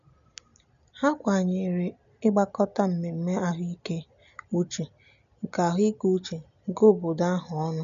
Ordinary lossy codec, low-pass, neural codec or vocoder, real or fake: none; 7.2 kHz; none; real